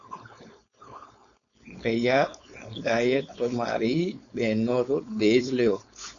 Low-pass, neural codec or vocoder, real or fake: 7.2 kHz; codec, 16 kHz, 4.8 kbps, FACodec; fake